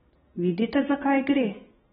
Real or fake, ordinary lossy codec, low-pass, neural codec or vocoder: real; AAC, 16 kbps; 7.2 kHz; none